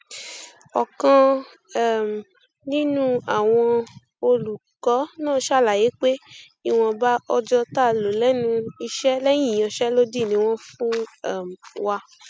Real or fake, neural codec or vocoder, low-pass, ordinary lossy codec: real; none; none; none